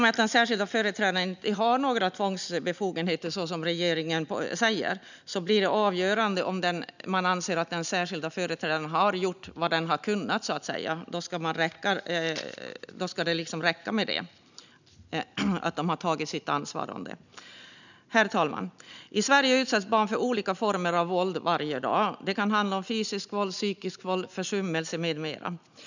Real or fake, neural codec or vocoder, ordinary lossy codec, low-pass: real; none; none; 7.2 kHz